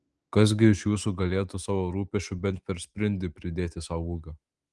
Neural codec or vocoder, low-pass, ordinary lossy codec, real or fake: autoencoder, 48 kHz, 128 numbers a frame, DAC-VAE, trained on Japanese speech; 10.8 kHz; Opus, 24 kbps; fake